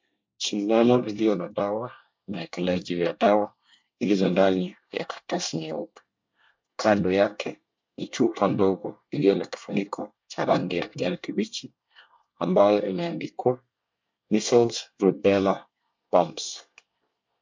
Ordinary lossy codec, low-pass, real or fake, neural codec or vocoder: MP3, 64 kbps; 7.2 kHz; fake; codec, 24 kHz, 1 kbps, SNAC